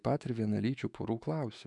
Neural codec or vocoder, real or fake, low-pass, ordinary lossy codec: autoencoder, 48 kHz, 128 numbers a frame, DAC-VAE, trained on Japanese speech; fake; 10.8 kHz; MP3, 96 kbps